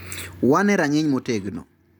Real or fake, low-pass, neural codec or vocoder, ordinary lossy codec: real; none; none; none